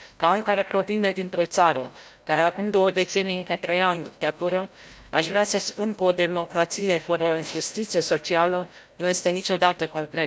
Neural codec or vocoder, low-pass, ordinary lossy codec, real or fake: codec, 16 kHz, 0.5 kbps, FreqCodec, larger model; none; none; fake